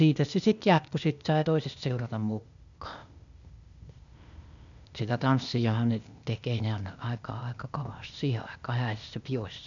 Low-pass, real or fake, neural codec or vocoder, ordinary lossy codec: 7.2 kHz; fake; codec, 16 kHz, 0.8 kbps, ZipCodec; none